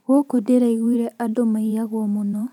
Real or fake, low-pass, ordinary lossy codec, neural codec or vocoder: fake; 19.8 kHz; none; vocoder, 44.1 kHz, 128 mel bands every 512 samples, BigVGAN v2